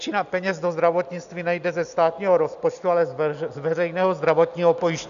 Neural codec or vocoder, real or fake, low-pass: none; real; 7.2 kHz